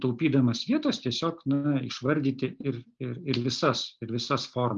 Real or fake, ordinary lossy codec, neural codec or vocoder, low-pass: real; Opus, 32 kbps; none; 7.2 kHz